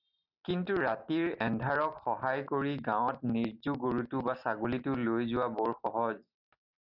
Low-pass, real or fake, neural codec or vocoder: 5.4 kHz; real; none